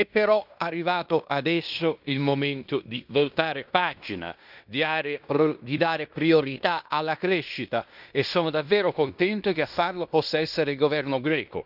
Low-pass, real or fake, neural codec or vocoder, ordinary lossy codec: 5.4 kHz; fake; codec, 16 kHz in and 24 kHz out, 0.9 kbps, LongCat-Audio-Codec, fine tuned four codebook decoder; none